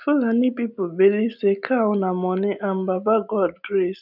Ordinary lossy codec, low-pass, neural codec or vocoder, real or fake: none; 5.4 kHz; none; real